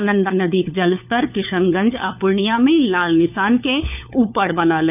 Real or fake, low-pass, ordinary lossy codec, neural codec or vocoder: fake; 3.6 kHz; none; codec, 16 kHz, 4 kbps, FunCodec, trained on LibriTTS, 50 frames a second